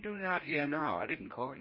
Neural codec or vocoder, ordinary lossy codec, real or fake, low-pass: codec, 16 kHz, 1 kbps, FreqCodec, larger model; MP3, 24 kbps; fake; 5.4 kHz